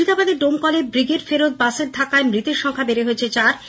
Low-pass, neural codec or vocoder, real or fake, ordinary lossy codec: none; none; real; none